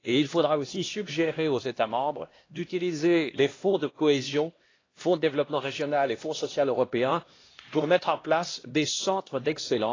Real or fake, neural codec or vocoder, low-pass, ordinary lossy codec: fake; codec, 16 kHz, 1 kbps, X-Codec, HuBERT features, trained on LibriSpeech; 7.2 kHz; AAC, 32 kbps